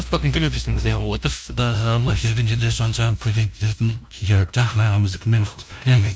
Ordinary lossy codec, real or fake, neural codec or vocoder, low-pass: none; fake; codec, 16 kHz, 0.5 kbps, FunCodec, trained on LibriTTS, 25 frames a second; none